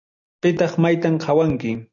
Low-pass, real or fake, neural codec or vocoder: 7.2 kHz; real; none